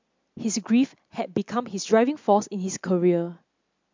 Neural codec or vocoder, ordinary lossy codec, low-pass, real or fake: none; MP3, 64 kbps; 7.2 kHz; real